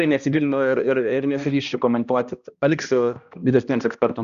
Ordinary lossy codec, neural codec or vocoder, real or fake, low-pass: Opus, 64 kbps; codec, 16 kHz, 1 kbps, X-Codec, HuBERT features, trained on balanced general audio; fake; 7.2 kHz